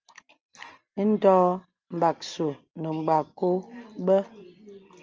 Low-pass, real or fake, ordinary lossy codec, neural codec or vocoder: 7.2 kHz; real; Opus, 24 kbps; none